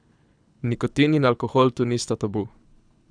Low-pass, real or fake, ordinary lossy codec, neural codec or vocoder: 9.9 kHz; fake; none; codec, 24 kHz, 6 kbps, HILCodec